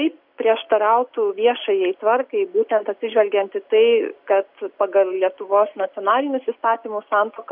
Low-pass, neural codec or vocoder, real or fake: 5.4 kHz; none; real